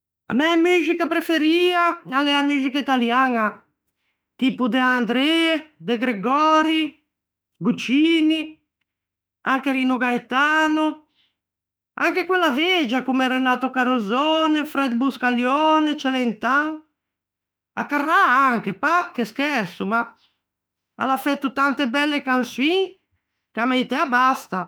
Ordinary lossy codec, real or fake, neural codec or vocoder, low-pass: none; fake; autoencoder, 48 kHz, 32 numbers a frame, DAC-VAE, trained on Japanese speech; none